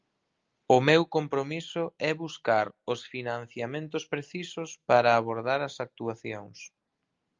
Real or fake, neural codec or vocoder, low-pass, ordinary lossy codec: real; none; 7.2 kHz; Opus, 32 kbps